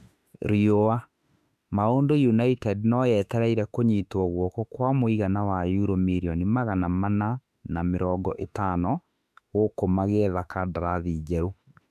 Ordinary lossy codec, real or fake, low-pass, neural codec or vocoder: none; fake; 14.4 kHz; autoencoder, 48 kHz, 32 numbers a frame, DAC-VAE, trained on Japanese speech